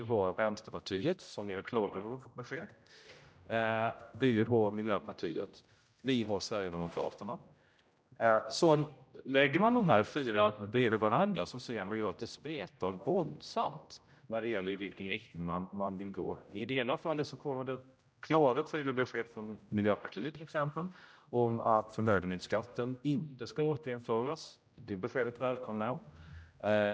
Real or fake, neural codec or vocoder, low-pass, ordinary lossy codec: fake; codec, 16 kHz, 0.5 kbps, X-Codec, HuBERT features, trained on general audio; none; none